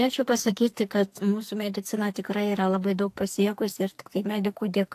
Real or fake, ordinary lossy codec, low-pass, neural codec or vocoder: fake; AAC, 64 kbps; 14.4 kHz; codec, 44.1 kHz, 2.6 kbps, SNAC